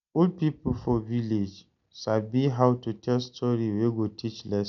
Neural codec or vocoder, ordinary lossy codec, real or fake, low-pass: none; none; real; 7.2 kHz